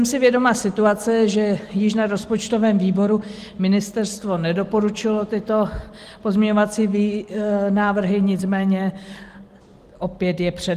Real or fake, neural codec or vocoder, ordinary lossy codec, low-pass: real; none; Opus, 24 kbps; 14.4 kHz